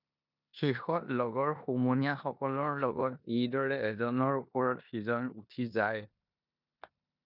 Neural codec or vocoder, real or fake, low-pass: codec, 16 kHz in and 24 kHz out, 0.9 kbps, LongCat-Audio-Codec, four codebook decoder; fake; 5.4 kHz